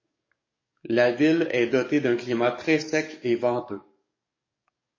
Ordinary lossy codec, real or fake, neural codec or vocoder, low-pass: MP3, 32 kbps; fake; codec, 44.1 kHz, 7.8 kbps, DAC; 7.2 kHz